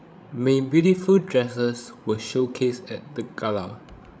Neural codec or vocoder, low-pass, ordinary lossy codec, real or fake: codec, 16 kHz, 16 kbps, FreqCodec, larger model; none; none; fake